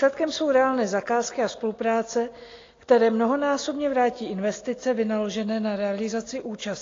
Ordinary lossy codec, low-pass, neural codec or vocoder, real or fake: AAC, 32 kbps; 7.2 kHz; none; real